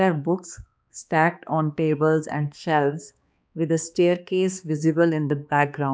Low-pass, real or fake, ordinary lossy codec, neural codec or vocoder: none; fake; none; codec, 16 kHz, 2 kbps, X-Codec, HuBERT features, trained on balanced general audio